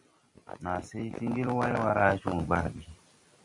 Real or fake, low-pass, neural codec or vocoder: fake; 10.8 kHz; vocoder, 44.1 kHz, 128 mel bands every 256 samples, BigVGAN v2